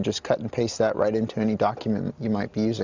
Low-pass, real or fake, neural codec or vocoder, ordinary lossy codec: 7.2 kHz; real; none; Opus, 64 kbps